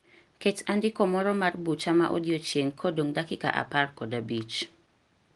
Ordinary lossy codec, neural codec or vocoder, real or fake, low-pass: Opus, 24 kbps; none; real; 10.8 kHz